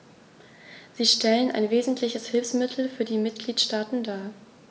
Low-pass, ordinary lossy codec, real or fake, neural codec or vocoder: none; none; real; none